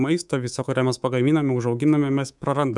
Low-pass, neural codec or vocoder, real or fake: 10.8 kHz; codec, 24 kHz, 3.1 kbps, DualCodec; fake